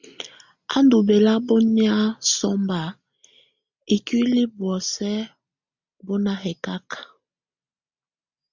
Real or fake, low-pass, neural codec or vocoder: real; 7.2 kHz; none